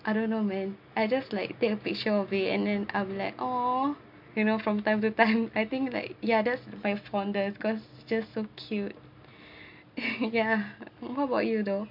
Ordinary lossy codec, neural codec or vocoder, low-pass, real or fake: MP3, 48 kbps; none; 5.4 kHz; real